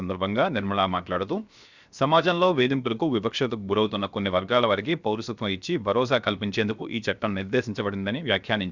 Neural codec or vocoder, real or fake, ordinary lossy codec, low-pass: codec, 16 kHz, 0.7 kbps, FocalCodec; fake; Opus, 64 kbps; 7.2 kHz